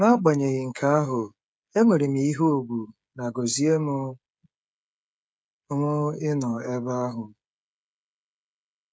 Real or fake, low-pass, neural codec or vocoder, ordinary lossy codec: fake; none; codec, 16 kHz, 16 kbps, FreqCodec, smaller model; none